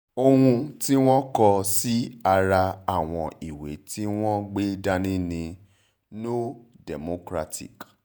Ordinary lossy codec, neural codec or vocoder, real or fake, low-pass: none; vocoder, 48 kHz, 128 mel bands, Vocos; fake; none